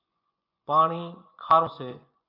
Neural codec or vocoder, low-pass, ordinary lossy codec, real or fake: vocoder, 24 kHz, 100 mel bands, Vocos; 5.4 kHz; MP3, 48 kbps; fake